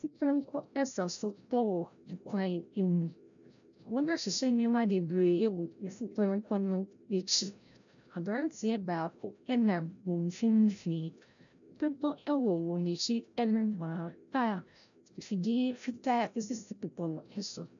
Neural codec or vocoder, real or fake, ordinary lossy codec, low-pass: codec, 16 kHz, 0.5 kbps, FreqCodec, larger model; fake; MP3, 96 kbps; 7.2 kHz